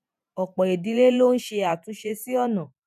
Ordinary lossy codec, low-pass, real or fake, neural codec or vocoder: none; 14.4 kHz; fake; vocoder, 48 kHz, 128 mel bands, Vocos